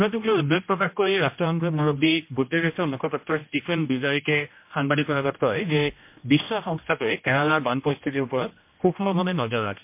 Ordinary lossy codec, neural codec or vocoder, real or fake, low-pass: MP3, 32 kbps; codec, 16 kHz, 1 kbps, X-Codec, HuBERT features, trained on general audio; fake; 3.6 kHz